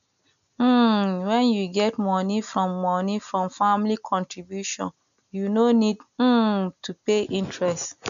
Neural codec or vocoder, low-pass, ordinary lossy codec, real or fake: none; 7.2 kHz; none; real